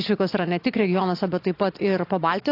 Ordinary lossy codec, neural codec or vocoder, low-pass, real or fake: AAC, 32 kbps; none; 5.4 kHz; real